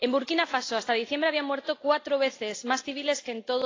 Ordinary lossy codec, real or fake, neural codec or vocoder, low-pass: AAC, 32 kbps; real; none; 7.2 kHz